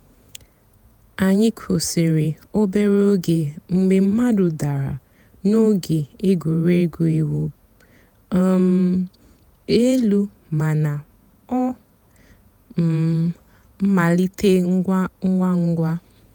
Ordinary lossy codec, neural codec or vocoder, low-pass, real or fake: none; vocoder, 48 kHz, 128 mel bands, Vocos; none; fake